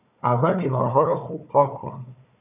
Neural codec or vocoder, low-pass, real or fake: codec, 16 kHz, 4 kbps, FunCodec, trained on Chinese and English, 50 frames a second; 3.6 kHz; fake